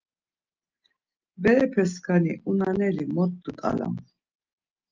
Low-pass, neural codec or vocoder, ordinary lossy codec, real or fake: 7.2 kHz; none; Opus, 32 kbps; real